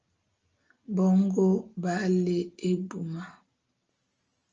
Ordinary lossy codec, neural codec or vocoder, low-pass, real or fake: Opus, 24 kbps; none; 7.2 kHz; real